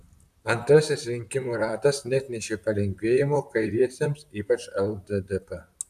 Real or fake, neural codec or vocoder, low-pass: fake; vocoder, 44.1 kHz, 128 mel bands, Pupu-Vocoder; 14.4 kHz